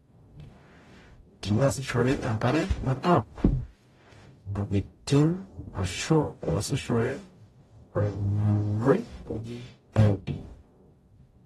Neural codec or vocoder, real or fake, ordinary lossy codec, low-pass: codec, 44.1 kHz, 0.9 kbps, DAC; fake; AAC, 32 kbps; 19.8 kHz